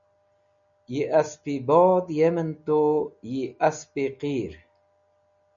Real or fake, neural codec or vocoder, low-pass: real; none; 7.2 kHz